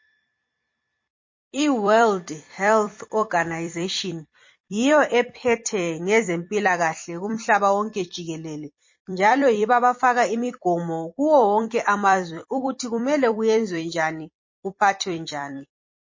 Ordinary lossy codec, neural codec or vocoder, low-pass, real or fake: MP3, 32 kbps; vocoder, 44.1 kHz, 128 mel bands every 256 samples, BigVGAN v2; 7.2 kHz; fake